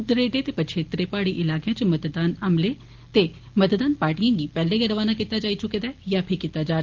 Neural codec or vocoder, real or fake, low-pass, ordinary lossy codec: none; real; 7.2 kHz; Opus, 16 kbps